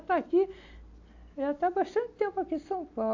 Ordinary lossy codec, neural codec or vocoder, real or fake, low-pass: AAC, 48 kbps; none; real; 7.2 kHz